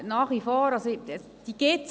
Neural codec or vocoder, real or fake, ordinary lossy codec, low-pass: none; real; none; none